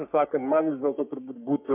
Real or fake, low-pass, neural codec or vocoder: fake; 3.6 kHz; codec, 44.1 kHz, 3.4 kbps, Pupu-Codec